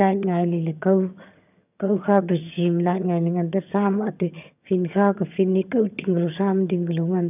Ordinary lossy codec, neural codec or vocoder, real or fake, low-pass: none; vocoder, 22.05 kHz, 80 mel bands, HiFi-GAN; fake; 3.6 kHz